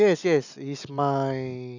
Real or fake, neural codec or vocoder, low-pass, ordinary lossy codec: real; none; 7.2 kHz; none